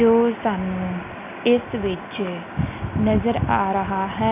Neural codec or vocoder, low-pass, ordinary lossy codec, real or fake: none; 3.6 kHz; none; real